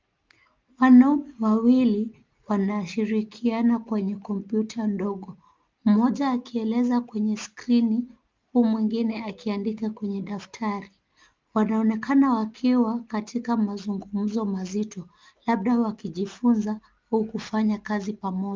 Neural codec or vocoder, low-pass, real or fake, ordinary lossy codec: none; 7.2 kHz; real; Opus, 24 kbps